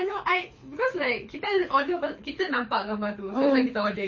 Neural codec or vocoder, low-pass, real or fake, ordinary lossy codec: codec, 24 kHz, 6 kbps, HILCodec; 7.2 kHz; fake; MP3, 32 kbps